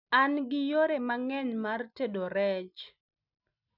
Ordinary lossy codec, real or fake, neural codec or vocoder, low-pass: none; real; none; 5.4 kHz